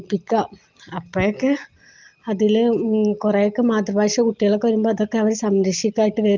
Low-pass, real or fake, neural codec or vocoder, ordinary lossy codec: 7.2 kHz; real; none; Opus, 24 kbps